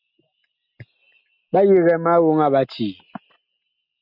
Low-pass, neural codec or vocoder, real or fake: 5.4 kHz; none; real